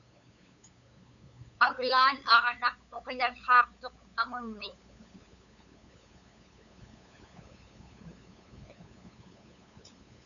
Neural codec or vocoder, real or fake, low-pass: codec, 16 kHz, 8 kbps, FunCodec, trained on LibriTTS, 25 frames a second; fake; 7.2 kHz